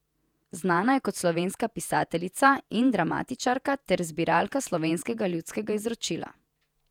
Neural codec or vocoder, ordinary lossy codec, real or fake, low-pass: vocoder, 48 kHz, 128 mel bands, Vocos; none; fake; 19.8 kHz